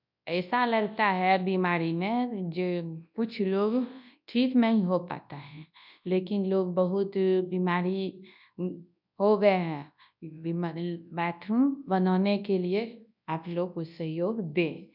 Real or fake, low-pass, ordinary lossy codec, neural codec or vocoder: fake; 5.4 kHz; none; codec, 24 kHz, 0.9 kbps, WavTokenizer, large speech release